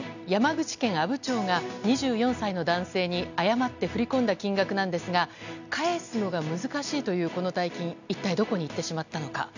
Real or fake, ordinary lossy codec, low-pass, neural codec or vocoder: real; none; 7.2 kHz; none